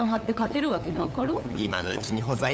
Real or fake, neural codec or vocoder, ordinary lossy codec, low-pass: fake; codec, 16 kHz, 8 kbps, FunCodec, trained on LibriTTS, 25 frames a second; none; none